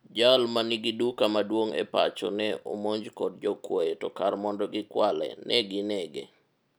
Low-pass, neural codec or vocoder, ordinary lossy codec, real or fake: none; none; none; real